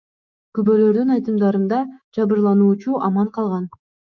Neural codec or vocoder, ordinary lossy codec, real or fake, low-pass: autoencoder, 48 kHz, 128 numbers a frame, DAC-VAE, trained on Japanese speech; MP3, 64 kbps; fake; 7.2 kHz